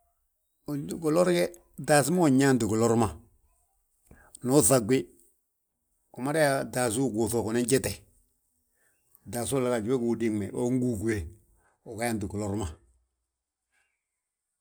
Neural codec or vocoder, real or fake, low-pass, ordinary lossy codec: none; real; none; none